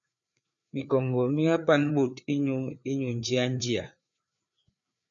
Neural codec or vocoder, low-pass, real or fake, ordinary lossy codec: codec, 16 kHz, 4 kbps, FreqCodec, larger model; 7.2 kHz; fake; MP3, 64 kbps